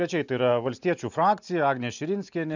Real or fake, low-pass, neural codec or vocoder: real; 7.2 kHz; none